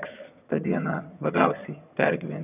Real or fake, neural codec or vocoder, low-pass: fake; vocoder, 22.05 kHz, 80 mel bands, HiFi-GAN; 3.6 kHz